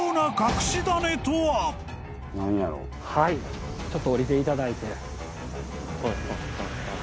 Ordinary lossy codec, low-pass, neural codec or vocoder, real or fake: none; none; none; real